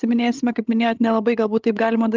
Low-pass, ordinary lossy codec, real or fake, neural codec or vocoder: 7.2 kHz; Opus, 32 kbps; fake; codec, 16 kHz, 16 kbps, FreqCodec, larger model